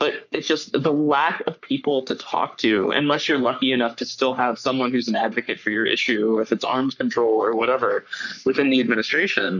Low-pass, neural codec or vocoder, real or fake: 7.2 kHz; codec, 44.1 kHz, 3.4 kbps, Pupu-Codec; fake